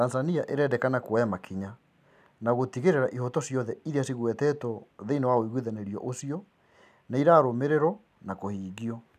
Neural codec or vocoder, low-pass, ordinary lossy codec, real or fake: none; 14.4 kHz; none; real